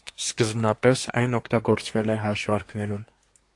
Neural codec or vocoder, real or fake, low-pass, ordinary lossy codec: codec, 24 kHz, 1 kbps, SNAC; fake; 10.8 kHz; AAC, 48 kbps